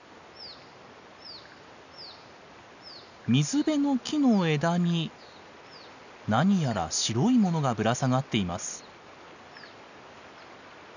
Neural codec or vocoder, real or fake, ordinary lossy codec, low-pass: none; real; none; 7.2 kHz